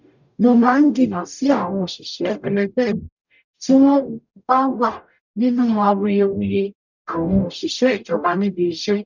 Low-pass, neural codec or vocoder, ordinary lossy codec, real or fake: 7.2 kHz; codec, 44.1 kHz, 0.9 kbps, DAC; none; fake